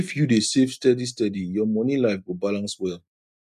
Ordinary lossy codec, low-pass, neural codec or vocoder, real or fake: none; 14.4 kHz; none; real